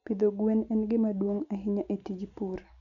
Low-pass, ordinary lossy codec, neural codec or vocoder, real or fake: 7.2 kHz; none; none; real